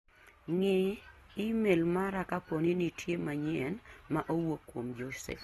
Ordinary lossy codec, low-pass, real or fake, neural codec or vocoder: AAC, 32 kbps; 19.8 kHz; real; none